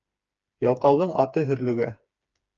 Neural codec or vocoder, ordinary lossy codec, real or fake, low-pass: codec, 16 kHz, 4 kbps, FreqCodec, smaller model; Opus, 24 kbps; fake; 7.2 kHz